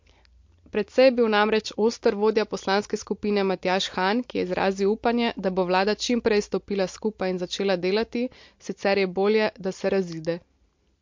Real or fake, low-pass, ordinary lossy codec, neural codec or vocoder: real; 7.2 kHz; MP3, 48 kbps; none